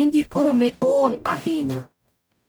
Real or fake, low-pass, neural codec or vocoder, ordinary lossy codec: fake; none; codec, 44.1 kHz, 0.9 kbps, DAC; none